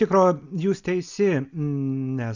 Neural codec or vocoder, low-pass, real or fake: none; 7.2 kHz; real